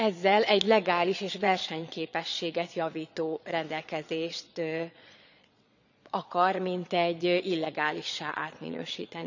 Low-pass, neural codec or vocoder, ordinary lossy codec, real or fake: 7.2 kHz; vocoder, 22.05 kHz, 80 mel bands, Vocos; none; fake